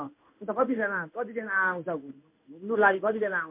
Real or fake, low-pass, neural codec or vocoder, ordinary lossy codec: fake; 3.6 kHz; codec, 16 kHz in and 24 kHz out, 1 kbps, XY-Tokenizer; MP3, 32 kbps